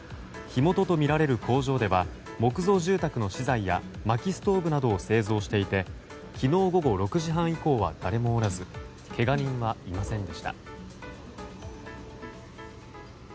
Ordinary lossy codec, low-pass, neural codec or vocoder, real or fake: none; none; none; real